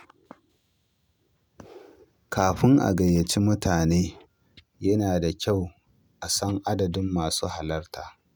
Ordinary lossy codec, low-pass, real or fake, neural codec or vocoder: none; none; real; none